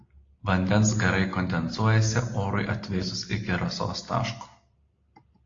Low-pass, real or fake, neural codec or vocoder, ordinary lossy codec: 7.2 kHz; real; none; AAC, 32 kbps